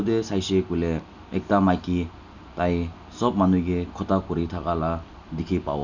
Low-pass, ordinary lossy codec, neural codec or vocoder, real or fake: 7.2 kHz; none; none; real